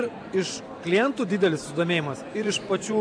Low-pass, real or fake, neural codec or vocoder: 9.9 kHz; fake; vocoder, 44.1 kHz, 128 mel bands every 512 samples, BigVGAN v2